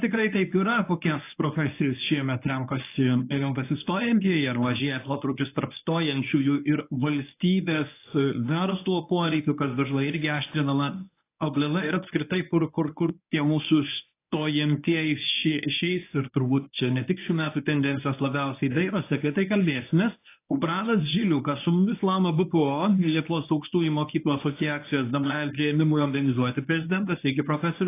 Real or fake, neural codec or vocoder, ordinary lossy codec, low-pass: fake; codec, 24 kHz, 0.9 kbps, WavTokenizer, medium speech release version 1; AAC, 24 kbps; 3.6 kHz